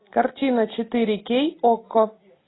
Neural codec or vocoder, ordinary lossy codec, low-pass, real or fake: none; AAC, 16 kbps; 7.2 kHz; real